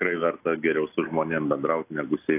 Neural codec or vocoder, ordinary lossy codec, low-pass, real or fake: none; AAC, 24 kbps; 3.6 kHz; real